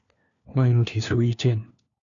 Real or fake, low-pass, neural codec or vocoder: fake; 7.2 kHz; codec, 16 kHz, 1 kbps, FunCodec, trained on LibriTTS, 50 frames a second